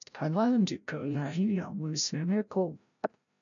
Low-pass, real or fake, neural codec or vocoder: 7.2 kHz; fake; codec, 16 kHz, 0.5 kbps, FreqCodec, larger model